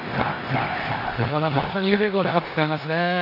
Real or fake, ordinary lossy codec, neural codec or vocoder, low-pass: fake; none; codec, 16 kHz in and 24 kHz out, 0.9 kbps, LongCat-Audio-Codec, four codebook decoder; 5.4 kHz